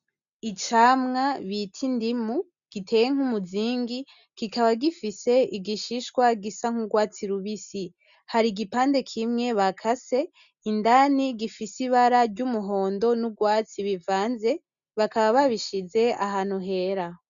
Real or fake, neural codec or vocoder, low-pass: real; none; 7.2 kHz